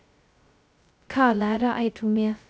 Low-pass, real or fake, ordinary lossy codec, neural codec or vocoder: none; fake; none; codec, 16 kHz, 0.2 kbps, FocalCodec